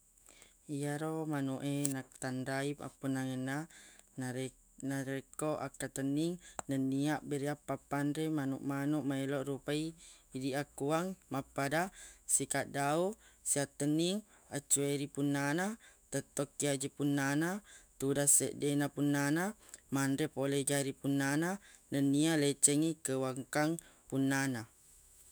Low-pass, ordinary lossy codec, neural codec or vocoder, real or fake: none; none; autoencoder, 48 kHz, 128 numbers a frame, DAC-VAE, trained on Japanese speech; fake